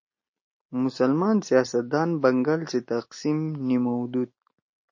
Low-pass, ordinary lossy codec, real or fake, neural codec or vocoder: 7.2 kHz; MP3, 32 kbps; real; none